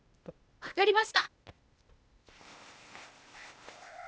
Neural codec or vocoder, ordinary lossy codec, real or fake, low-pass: codec, 16 kHz, 0.8 kbps, ZipCodec; none; fake; none